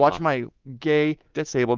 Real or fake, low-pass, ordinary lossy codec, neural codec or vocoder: fake; 7.2 kHz; Opus, 24 kbps; codec, 24 kHz, 3.1 kbps, DualCodec